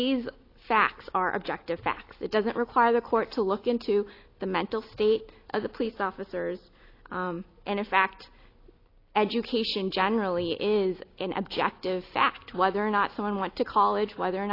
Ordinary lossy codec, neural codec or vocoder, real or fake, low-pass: AAC, 32 kbps; none; real; 5.4 kHz